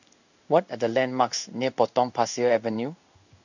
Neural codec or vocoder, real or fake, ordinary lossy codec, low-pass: codec, 16 kHz in and 24 kHz out, 1 kbps, XY-Tokenizer; fake; none; 7.2 kHz